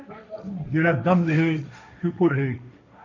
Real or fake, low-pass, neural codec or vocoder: fake; 7.2 kHz; codec, 16 kHz, 1.1 kbps, Voila-Tokenizer